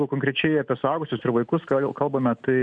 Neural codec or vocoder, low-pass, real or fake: none; 9.9 kHz; real